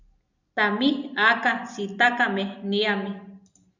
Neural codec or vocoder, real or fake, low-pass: none; real; 7.2 kHz